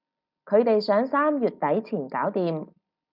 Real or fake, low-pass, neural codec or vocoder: real; 5.4 kHz; none